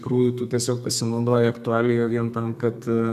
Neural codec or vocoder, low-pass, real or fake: codec, 32 kHz, 1.9 kbps, SNAC; 14.4 kHz; fake